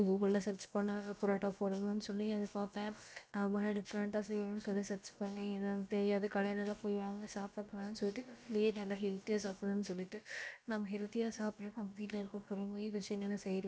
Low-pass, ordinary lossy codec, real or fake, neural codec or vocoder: none; none; fake; codec, 16 kHz, about 1 kbps, DyCAST, with the encoder's durations